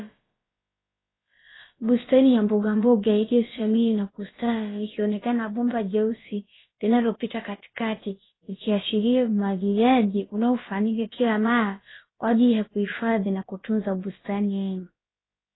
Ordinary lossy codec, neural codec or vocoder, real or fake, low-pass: AAC, 16 kbps; codec, 16 kHz, about 1 kbps, DyCAST, with the encoder's durations; fake; 7.2 kHz